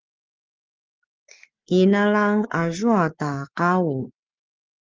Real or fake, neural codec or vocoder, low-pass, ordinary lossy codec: fake; codec, 44.1 kHz, 7.8 kbps, Pupu-Codec; 7.2 kHz; Opus, 24 kbps